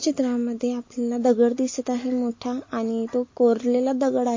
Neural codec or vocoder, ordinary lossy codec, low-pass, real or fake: none; MP3, 32 kbps; 7.2 kHz; real